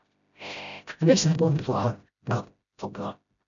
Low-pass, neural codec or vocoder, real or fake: 7.2 kHz; codec, 16 kHz, 0.5 kbps, FreqCodec, smaller model; fake